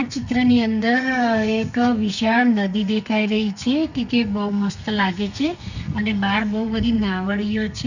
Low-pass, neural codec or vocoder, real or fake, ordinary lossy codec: 7.2 kHz; codec, 32 kHz, 1.9 kbps, SNAC; fake; none